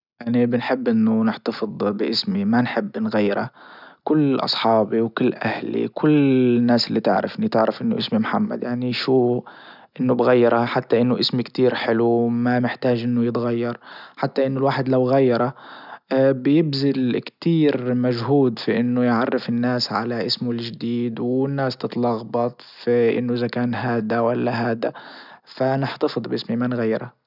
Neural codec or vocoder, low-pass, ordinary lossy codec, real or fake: none; 5.4 kHz; none; real